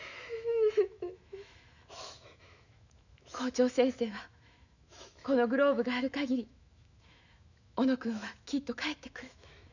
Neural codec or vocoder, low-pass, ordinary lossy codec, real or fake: autoencoder, 48 kHz, 128 numbers a frame, DAC-VAE, trained on Japanese speech; 7.2 kHz; none; fake